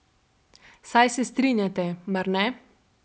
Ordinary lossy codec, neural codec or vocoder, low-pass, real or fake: none; none; none; real